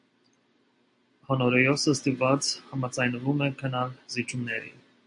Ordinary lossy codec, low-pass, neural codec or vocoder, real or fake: AAC, 64 kbps; 9.9 kHz; none; real